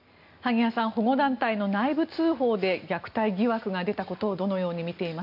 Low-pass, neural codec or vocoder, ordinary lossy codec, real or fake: 5.4 kHz; none; none; real